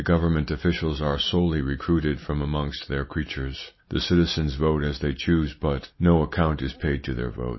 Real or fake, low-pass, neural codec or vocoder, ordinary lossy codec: real; 7.2 kHz; none; MP3, 24 kbps